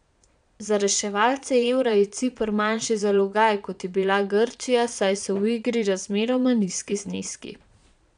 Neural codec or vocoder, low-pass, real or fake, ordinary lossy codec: vocoder, 22.05 kHz, 80 mel bands, Vocos; 9.9 kHz; fake; none